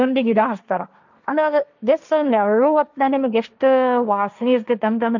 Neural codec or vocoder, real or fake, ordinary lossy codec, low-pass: codec, 16 kHz, 1.1 kbps, Voila-Tokenizer; fake; none; 7.2 kHz